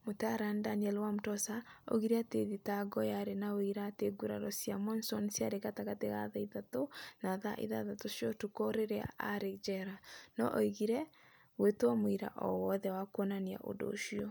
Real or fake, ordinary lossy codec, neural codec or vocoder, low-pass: real; none; none; none